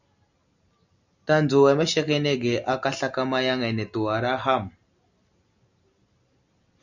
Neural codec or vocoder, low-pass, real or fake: none; 7.2 kHz; real